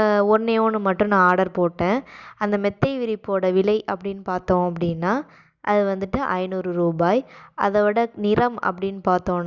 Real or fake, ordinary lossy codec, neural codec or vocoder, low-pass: real; Opus, 64 kbps; none; 7.2 kHz